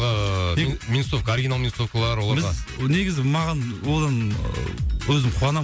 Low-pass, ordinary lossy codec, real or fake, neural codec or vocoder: none; none; real; none